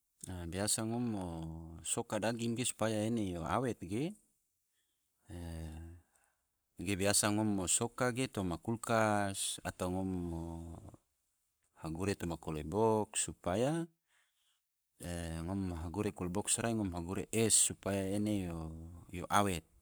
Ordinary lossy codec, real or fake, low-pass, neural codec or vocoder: none; fake; none; codec, 44.1 kHz, 7.8 kbps, Pupu-Codec